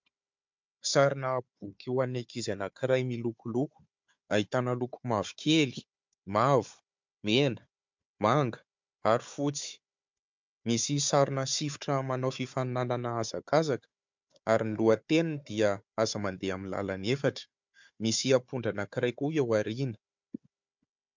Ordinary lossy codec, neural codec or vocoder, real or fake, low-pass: MP3, 64 kbps; codec, 16 kHz, 4 kbps, FunCodec, trained on Chinese and English, 50 frames a second; fake; 7.2 kHz